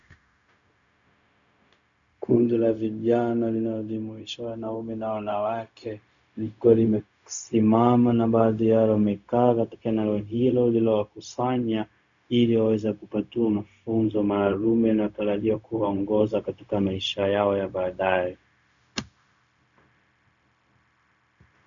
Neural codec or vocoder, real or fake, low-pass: codec, 16 kHz, 0.4 kbps, LongCat-Audio-Codec; fake; 7.2 kHz